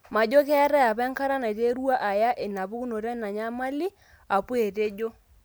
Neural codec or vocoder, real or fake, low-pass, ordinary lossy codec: none; real; none; none